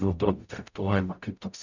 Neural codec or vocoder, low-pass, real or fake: codec, 44.1 kHz, 0.9 kbps, DAC; 7.2 kHz; fake